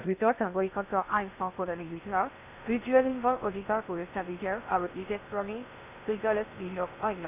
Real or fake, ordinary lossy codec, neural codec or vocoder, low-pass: fake; none; codec, 16 kHz in and 24 kHz out, 0.6 kbps, FocalCodec, streaming, 2048 codes; 3.6 kHz